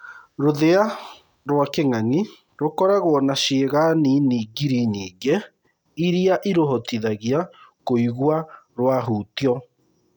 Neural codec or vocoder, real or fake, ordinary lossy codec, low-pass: none; real; none; 19.8 kHz